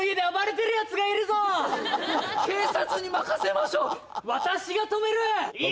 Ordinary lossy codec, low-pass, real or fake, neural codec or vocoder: none; none; real; none